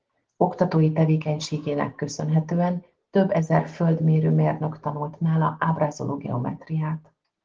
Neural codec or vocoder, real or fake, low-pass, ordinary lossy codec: none; real; 7.2 kHz; Opus, 16 kbps